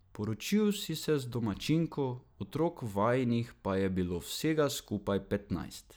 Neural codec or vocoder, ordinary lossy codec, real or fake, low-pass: none; none; real; none